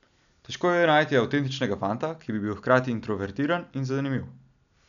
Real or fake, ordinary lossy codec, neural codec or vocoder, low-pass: real; none; none; 7.2 kHz